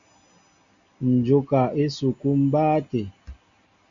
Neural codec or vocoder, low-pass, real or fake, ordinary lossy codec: none; 7.2 kHz; real; Opus, 64 kbps